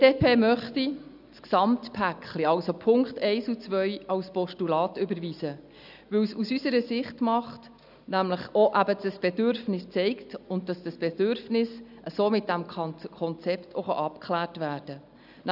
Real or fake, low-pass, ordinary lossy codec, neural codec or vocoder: real; 5.4 kHz; none; none